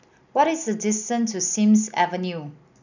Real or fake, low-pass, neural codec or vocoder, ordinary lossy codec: real; 7.2 kHz; none; none